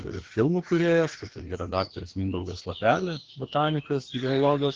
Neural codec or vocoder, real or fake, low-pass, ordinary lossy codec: codec, 16 kHz, 2 kbps, FreqCodec, larger model; fake; 7.2 kHz; Opus, 16 kbps